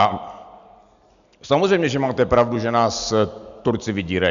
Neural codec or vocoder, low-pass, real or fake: none; 7.2 kHz; real